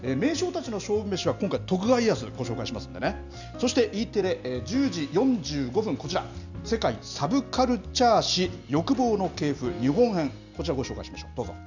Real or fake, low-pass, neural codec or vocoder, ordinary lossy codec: real; 7.2 kHz; none; none